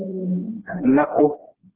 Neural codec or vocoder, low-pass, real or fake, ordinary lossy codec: codec, 16 kHz, 2 kbps, FreqCodec, smaller model; 3.6 kHz; fake; Opus, 16 kbps